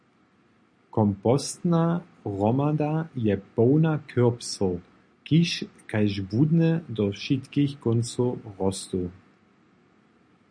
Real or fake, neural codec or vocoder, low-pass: real; none; 9.9 kHz